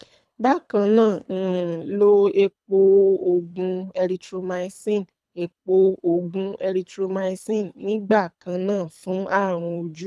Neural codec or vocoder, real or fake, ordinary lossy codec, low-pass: codec, 24 kHz, 3 kbps, HILCodec; fake; none; none